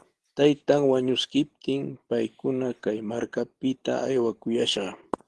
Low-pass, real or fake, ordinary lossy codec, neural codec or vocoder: 10.8 kHz; real; Opus, 16 kbps; none